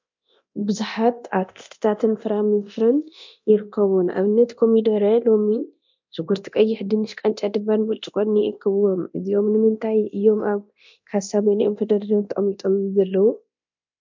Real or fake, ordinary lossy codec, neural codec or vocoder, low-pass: fake; MP3, 64 kbps; codec, 24 kHz, 0.9 kbps, DualCodec; 7.2 kHz